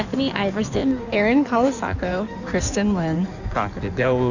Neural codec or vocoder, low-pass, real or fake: codec, 16 kHz in and 24 kHz out, 1.1 kbps, FireRedTTS-2 codec; 7.2 kHz; fake